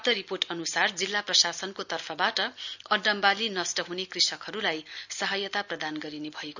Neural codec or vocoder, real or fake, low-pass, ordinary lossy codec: none; real; 7.2 kHz; none